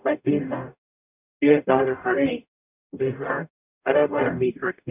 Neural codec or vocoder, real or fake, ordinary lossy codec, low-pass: codec, 44.1 kHz, 0.9 kbps, DAC; fake; none; 3.6 kHz